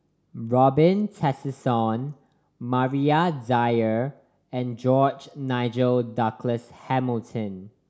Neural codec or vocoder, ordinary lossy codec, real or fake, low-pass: none; none; real; none